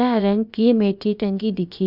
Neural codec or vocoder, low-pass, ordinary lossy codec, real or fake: codec, 16 kHz, about 1 kbps, DyCAST, with the encoder's durations; 5.4 kHz; none; fake